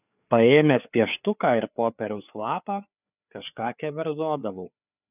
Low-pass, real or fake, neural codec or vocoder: 3.6 kHz; fake; codec, 16 kHz, 4 kbps, FreqCodec, larger model